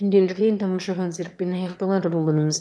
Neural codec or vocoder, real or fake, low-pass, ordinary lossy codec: autoencoder, 22.05 kHz, a latent of 192 numbers a frame, VITS, trained on one speaker; fake; none; none